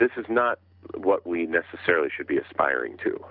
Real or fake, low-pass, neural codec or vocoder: real; 5.4 kHz; none